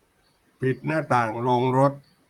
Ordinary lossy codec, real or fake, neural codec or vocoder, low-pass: none; fake; vocoder, 44.1 kHz, 128 mel bands, Pupu-Vocoder; 19.8 kHz